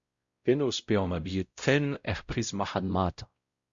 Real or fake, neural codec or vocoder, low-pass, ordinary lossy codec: fake; codec, 16 kHz, 0.5 kbps, X-Codec, WavLM features, trained on Multilingual LibriSpeech; 7.2 kHz; Opus, 64 kbps